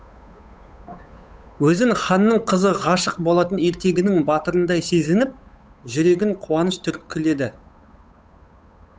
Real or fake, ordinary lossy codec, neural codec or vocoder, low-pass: fake; none; codec, 16 kHz, 8 kbps, FunCodec, trained on Chinese and English, 25 frames a second; none